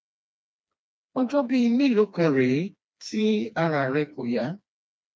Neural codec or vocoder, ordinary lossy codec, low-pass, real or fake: codec, 16 kHz, 2 kbps, FreqCodec, smaller model; none; none; fake